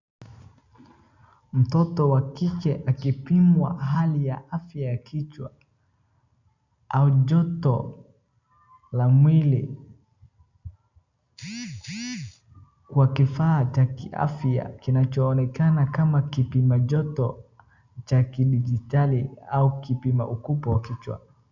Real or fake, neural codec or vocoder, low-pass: real; none; 7.2 kHz